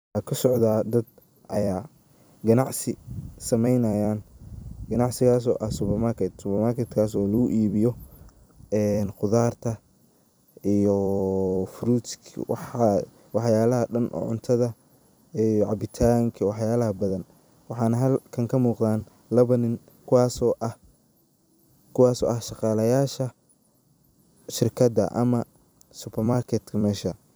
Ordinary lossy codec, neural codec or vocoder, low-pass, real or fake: none; vocoder, 44.1 kHz, 128 mel bands every 256 samples, BigVGAN v2; none; fake